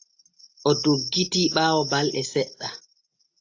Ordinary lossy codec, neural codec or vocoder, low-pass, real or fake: AAC, 48 kbps; none; 7.2 kHz; real